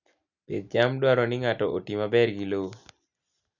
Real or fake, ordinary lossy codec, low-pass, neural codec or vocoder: real; none; 7.2 kHz; none